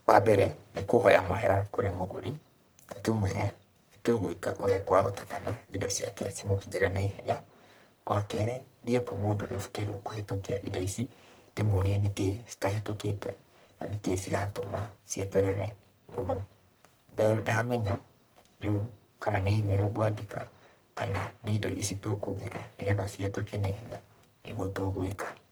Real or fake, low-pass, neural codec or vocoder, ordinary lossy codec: fake; none; codec, 44.1 kHz, 1.7 kbps, Pupu-Codec; none